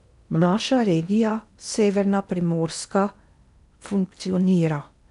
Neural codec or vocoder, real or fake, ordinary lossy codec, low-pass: codec, 16 kHz in and 24 kHz out, 0.8 kbps, FocalCodec, streaming, 65536 codes; fake; none; 10.8 kHz